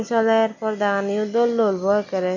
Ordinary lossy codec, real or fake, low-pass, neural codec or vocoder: MP3, 64 kbps; real; 7.2 kHz; none